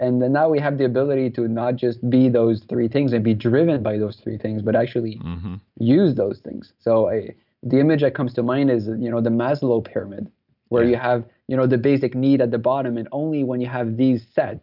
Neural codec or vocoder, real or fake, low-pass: none; real; 5.4 kHz